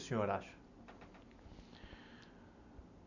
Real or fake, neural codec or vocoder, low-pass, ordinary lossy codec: real; none; 7.2 kHz; none